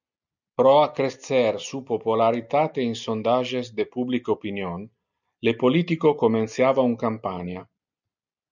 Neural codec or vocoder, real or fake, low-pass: none; real; 7.2 kHz